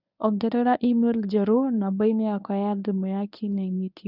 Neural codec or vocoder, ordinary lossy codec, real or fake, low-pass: codec, 24 kHz, 0.9 kbps, WavTokenizer, medium speech release version 1; none; fake; 5.4 kHz